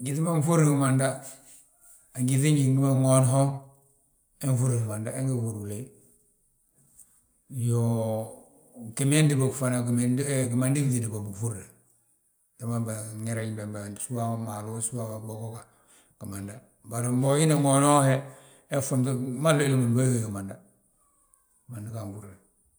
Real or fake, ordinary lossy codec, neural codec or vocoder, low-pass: fake; none; vocoder, 44.1 kHz, 128 mel bands every 512 samples, BigVGAN v2; none